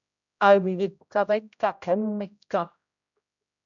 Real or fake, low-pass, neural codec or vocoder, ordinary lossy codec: fake; 7.2 kHz; codec, 16 kHz, 0.5 kbps, X-Codec, HuBERT features, trained on general audio; MP3, 96 kbps